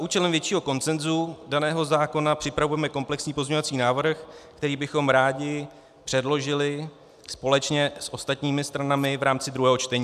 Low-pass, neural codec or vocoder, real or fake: 14.4 kHz; none; real